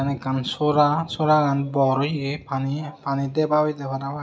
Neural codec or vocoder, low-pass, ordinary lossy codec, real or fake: none; none; none; real